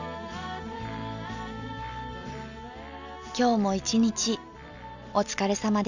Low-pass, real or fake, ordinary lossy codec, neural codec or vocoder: 7.2 kHz; real; none; none